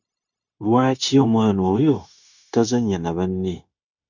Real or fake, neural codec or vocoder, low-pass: fake; codec, 16 kHz, 0.9 kbps, LongCat-Audio-Codec; 7.2 kHz